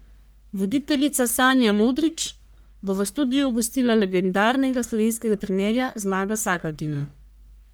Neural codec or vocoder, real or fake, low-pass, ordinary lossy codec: codec, 44.1 kHz, 1.7 kbps, Pupu-Codec; fake; none; none